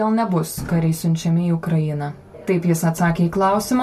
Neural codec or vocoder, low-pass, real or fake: none; 14.4 kHz; real